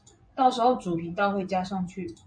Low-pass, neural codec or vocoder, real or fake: 9.9 kHz; vocoder, 24 kHz, 100 mel bands, Vocos; fake